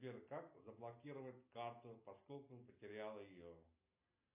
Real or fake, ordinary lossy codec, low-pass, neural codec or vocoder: real; AAC, 32 kbps; 3.6 kHz; none